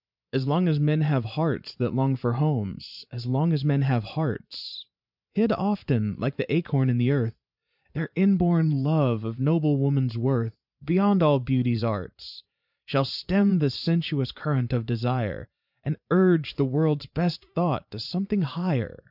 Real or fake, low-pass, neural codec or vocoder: fake; 5.4 kHz; vocoder, 44.1 kHz, 128 mel bands every 512 samples, BigVGAN v2